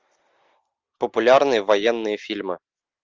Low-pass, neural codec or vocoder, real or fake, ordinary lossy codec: 7.2 kHz; none; real; Opus, 32 kbps